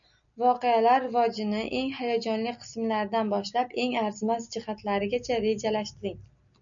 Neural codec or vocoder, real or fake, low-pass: none; real; 7.2 kHz